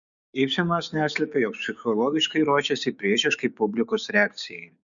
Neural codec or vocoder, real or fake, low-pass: codec, 16 kHz, 6 kbps, DAC; fake; 7.2 kHz